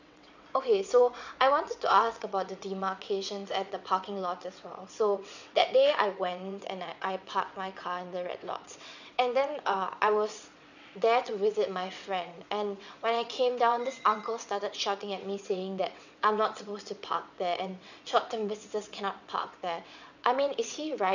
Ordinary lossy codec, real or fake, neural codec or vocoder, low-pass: none; fake; vocoder, 22.05 kHz, 80 mel bands, WaveNeXt; 7.2 kHz